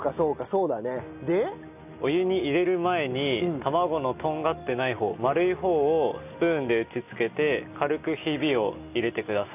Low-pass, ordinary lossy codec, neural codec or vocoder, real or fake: 3.6 kHz; none; none; real